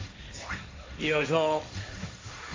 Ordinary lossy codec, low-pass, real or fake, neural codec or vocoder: AAC, 32 kbps; 7.2 kHz; fake; codec, 16 kHz, 1.1 kbps, Voila-Tokenizer